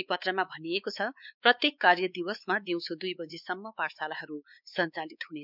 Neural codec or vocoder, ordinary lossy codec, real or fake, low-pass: codec, 16 kHz, 4 kbps, X-Codec, WavLM features, trained on Multilingual LibriSpeech; none; fake; 5.4 kHz